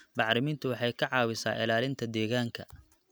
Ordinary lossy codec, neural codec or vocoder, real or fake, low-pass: none; none; real; none